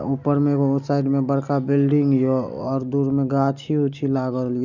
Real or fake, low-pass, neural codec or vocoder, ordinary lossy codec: real; 7.2 kHz; none; none